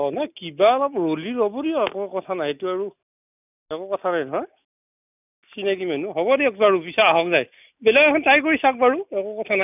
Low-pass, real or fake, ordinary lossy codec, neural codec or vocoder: 3.6 kHz; real; none; none